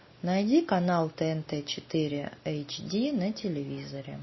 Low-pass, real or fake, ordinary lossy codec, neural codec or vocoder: 7.2 kHz; real; MP3, 24 kbps; none